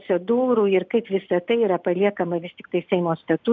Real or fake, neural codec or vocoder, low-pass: real; none; 7.2 kHz